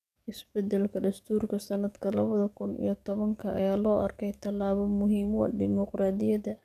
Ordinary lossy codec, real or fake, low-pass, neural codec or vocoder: none; fake; 14.4 kHz; codec, 44.1 kHz, 7.8 kbps, DAC